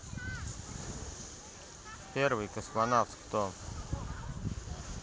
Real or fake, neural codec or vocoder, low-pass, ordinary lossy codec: real; none; none; none